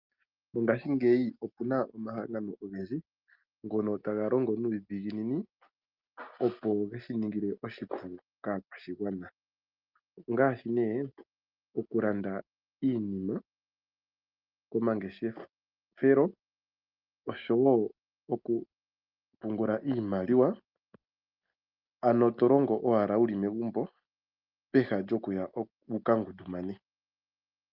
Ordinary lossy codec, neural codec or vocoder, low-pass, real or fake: Opus, 32 kbps; none; 5.4 kHz; real